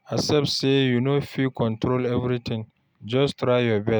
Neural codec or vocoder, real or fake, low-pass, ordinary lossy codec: none; real; none; none